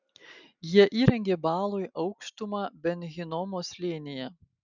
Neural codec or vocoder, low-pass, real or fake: none; 7.2 kHz; real